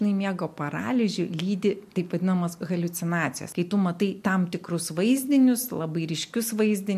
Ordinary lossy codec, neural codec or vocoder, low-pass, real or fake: MP3, 64 kbps; none; 14.4 kHz; real